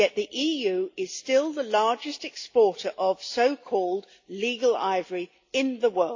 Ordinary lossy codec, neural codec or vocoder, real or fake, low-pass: AAC, 48 kbps; none; real; 7.2 kHz